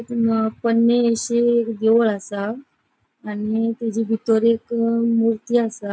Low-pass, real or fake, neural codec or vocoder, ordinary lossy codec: none; real; none; none